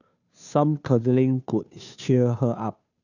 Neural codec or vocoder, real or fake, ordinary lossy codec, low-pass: codec, 16 kHz, 2 kbps, FunCodec, trained on Chinese and English, 25 frames a second; fake; none; 7.2 kHz